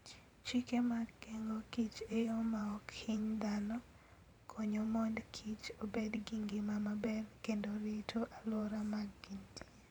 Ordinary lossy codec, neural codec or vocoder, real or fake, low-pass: none; vocoder, 44.1 kHz, 128 mel bands every 512 samples, BigVGAN v2; fake; 19.8 kHz